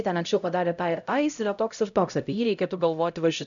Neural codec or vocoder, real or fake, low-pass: codec, 16 kHz, 0.5 kbps, X-Codec, HuBERT features, trained on LibriSpeech; fake; 7.2 kHz